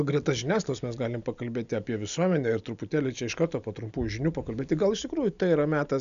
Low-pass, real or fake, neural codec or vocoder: 7.2 kHz; real; none